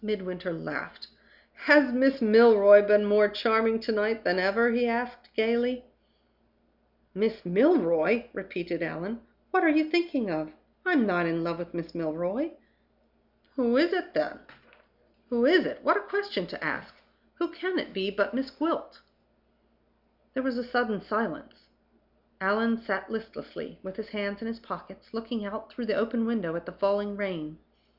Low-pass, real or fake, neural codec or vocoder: 5.4 kHz; real; none